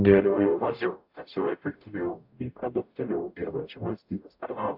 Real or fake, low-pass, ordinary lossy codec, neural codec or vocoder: fake; 5.4 kHz; AAC, 48 kbps; codec, 44.1 kHz, 0.9 kbps, DAC